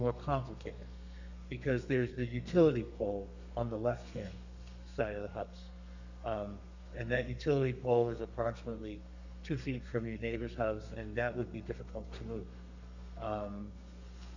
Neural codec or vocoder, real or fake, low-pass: codec, 32 kHz, 1.9 kbps, SNAC; fake; 7.2 kHz